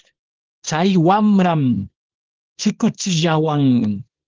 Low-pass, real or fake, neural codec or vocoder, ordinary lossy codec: 7.2 kHz; fake; codec, 24 kHz, 0.9 kbps, WavTokenizer, small release; Opus, 16 kbps